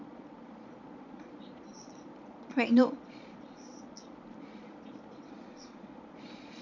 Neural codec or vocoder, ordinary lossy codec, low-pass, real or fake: vocoder, 22.05 kHz, 80 mel bands, Vocos; none; 7.2 kHz; fake